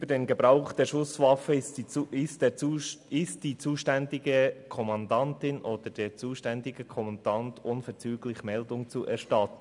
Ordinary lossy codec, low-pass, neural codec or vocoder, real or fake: MP3, 96 kbps; 10.8 kHz; none; real